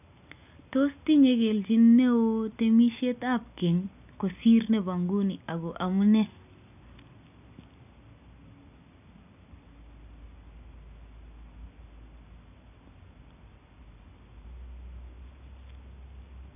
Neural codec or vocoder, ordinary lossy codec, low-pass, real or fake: none; none; 3.6 kHz; real